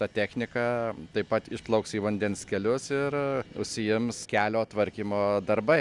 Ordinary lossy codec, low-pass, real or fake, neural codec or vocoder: Opus, 64 kbps; 10.8 kHz; real; none